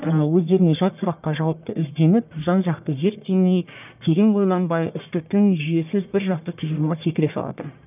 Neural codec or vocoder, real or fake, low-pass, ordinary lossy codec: codec, 44.1 kHz, 1.7 kbps, Pupu-Codec; fake; 3.6 kHz; none